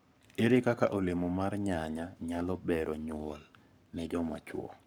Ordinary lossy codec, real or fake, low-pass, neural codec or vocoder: none; fake; none; codec, 44.1 kHz, 7.8 kbps, Pupu-Codec